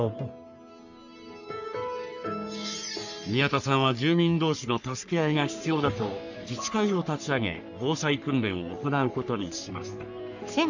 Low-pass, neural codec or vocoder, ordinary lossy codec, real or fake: 7.2 kHz; codec, 44.1 kHz, 3.4 kbps, Pupu-Codec; none; fake